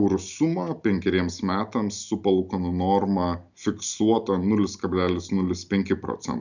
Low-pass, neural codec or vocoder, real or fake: 7.2 kHz; none; real